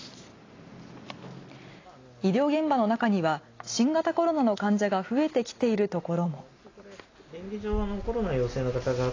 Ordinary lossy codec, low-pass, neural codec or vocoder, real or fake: AAC, 32 kbps; 7.2 kHz; none; real